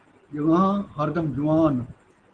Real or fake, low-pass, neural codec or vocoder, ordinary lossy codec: real; 9.9 kHz; none; Opus, 16 kbps